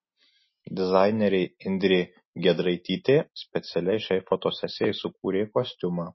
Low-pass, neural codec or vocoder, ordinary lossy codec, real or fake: 7.2 kHz; none; MP3, 24 kbps; real